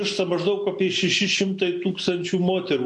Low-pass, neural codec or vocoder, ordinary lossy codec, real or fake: 10.8 kHz; none; MP3, 48 kbps; real